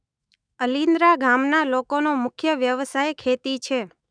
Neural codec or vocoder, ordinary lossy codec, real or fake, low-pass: autoencoder, 48 kHz, 128 numbers a frame, DAC-VAE, trained on Japanese speech; none; fake; 9.9 kHz